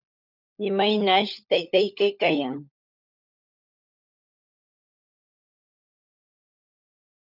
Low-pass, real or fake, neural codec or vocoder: 5.4 kHz; fake; codec, 16 kHz, 16 kbps, FunCodec, trained on LibriTTS, 50 frames a second